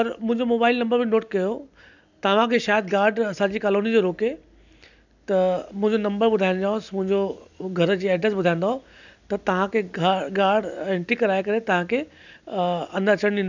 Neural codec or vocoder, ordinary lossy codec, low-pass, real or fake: none; none; 7.2 kHz; real